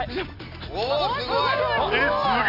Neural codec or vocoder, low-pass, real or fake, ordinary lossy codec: none; 5.4 kHz; real; none